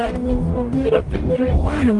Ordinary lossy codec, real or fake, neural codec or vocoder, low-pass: Opus, 24 kbps; fake; codec, 44.1 kHz, 0.9 kbps, DAC; 10.8 kHz